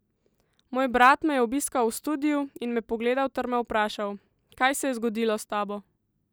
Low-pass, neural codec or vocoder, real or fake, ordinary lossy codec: none; none; real; none